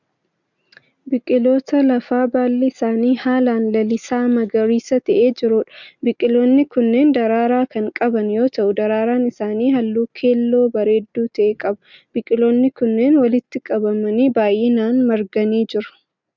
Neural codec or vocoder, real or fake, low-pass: none; real; 7.2 kHz